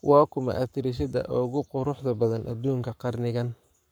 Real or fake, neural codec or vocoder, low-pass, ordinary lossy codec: fake; codec, 44.1 kHz, 7.8 kbps, Pupu-Codec; none; none